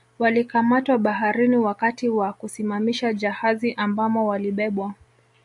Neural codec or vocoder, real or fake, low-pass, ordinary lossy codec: none; real; 10.8 kHz; MP3, 96 kbps